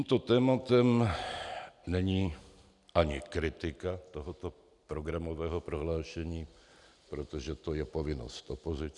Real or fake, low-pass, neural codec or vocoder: real; 10.8 kHz; none